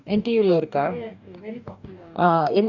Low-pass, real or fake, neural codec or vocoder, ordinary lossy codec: 7.2 kHz; fake; codec, 44.1 kHz, 2.6 kbps, DAC; none